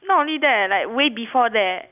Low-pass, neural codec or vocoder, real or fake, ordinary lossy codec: 3.6 kHz; none; real; none